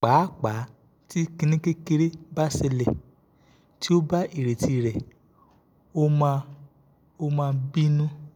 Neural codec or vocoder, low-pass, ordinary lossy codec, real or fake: none; 19.8 kHz; none; real